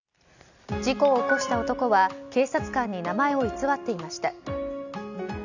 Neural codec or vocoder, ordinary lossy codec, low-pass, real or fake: none; none; 7.2 kHz; real